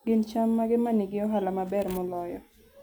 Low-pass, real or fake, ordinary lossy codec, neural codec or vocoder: none; real; none; none